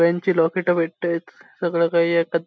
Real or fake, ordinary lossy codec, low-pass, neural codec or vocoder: real; none; none; none